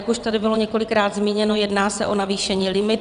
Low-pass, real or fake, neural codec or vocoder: 9.9 kHz; fake; vocoder, 22.05 kHz, 80 mel bands, WaveNeXt